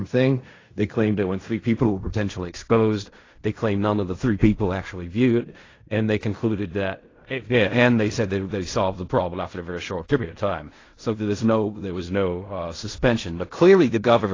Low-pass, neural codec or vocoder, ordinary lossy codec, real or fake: 7.2 kHz; codec, 16 kHz in and 24 kHz out, 0.4 kbps, LongCat-Audio-Codec, fine tuned four codebook decoder; AAC, 32 kbps; fake